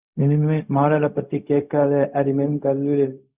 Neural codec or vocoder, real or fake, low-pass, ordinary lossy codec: codec, 16 kHz, 0.4 kbps, LongCat-Audio-Codec; fake; 3.6 kHz; Opus, 64 kbps